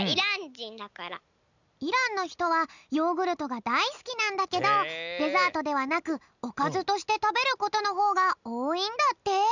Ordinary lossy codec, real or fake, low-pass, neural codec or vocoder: none; real; 7.2 kHz; none